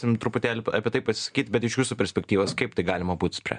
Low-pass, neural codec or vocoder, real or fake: 9.9 kHz; none; real